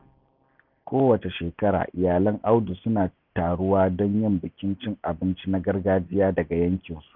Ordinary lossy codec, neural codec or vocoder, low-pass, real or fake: none; none; 5.4 kHz; real